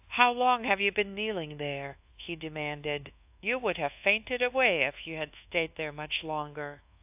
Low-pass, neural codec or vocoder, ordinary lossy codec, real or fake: 3.6 kHz; codec, 24 kHz, 1.2 kbps, DualCodec; AAC, 32 kbps; fake